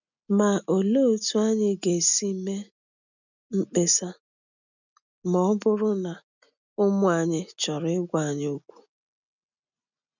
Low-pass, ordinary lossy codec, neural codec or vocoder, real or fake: 7.2 kHz; none; none; real